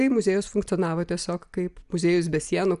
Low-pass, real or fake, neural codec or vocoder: 10.8 kHz; real; none